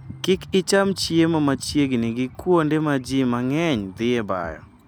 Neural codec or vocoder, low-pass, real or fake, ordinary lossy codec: none; none; real; none